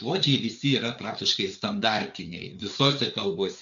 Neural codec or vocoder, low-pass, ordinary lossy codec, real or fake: codec, 16 kHz, 4 kbps, FreqCodec, larger model; 7.2 kHz; MP3, 64 kbps; fake